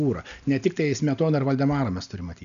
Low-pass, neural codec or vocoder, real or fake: 7.2 kHz; none; real